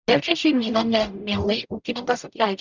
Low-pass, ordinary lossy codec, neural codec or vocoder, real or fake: 7.2 kHz; Opus, 64 kbps; codec, 44.1 kHz, 0.9 kbps, DAC; fake